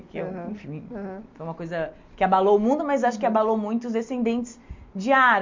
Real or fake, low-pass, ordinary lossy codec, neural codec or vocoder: real; 7.2 kHz; MP3, 48 kbps; none